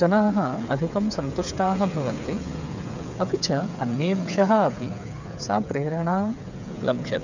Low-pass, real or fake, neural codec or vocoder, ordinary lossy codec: 7.2 kHz; fake; codec, 16 kHz, 8 kbps, FreqCodec, smaller model; none